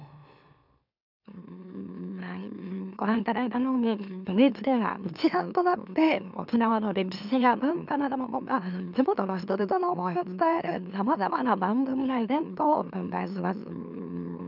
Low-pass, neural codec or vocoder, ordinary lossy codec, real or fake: 5.4 kHz; autoencoder, 44.1 kHz, a latent of 192 numbers a frame, MeloTTS; none; fake